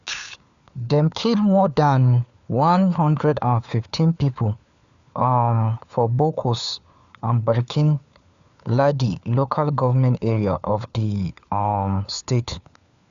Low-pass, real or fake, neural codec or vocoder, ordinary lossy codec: 7.2 kHz; fake; codec, 16 kHz, 4 kbps, FunCodec, trained on LibriTTS, 50 frames a second; Opus, 64 kbps